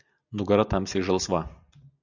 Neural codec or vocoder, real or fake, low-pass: none; real; 7.2 kHz